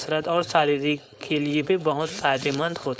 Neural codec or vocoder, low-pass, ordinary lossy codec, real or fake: codec, 16 kHz, 4.8 kbps, FACodec; none; none; fake